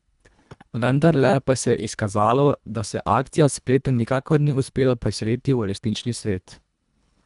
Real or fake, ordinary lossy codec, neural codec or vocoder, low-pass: fake; none; codec, 24 kHz, 1.5 kbps, HILCodec; 10.8 kHz